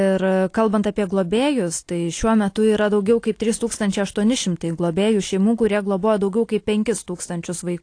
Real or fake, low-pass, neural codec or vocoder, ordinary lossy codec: real; 9.9 kHz; none; AAC, 48 kbps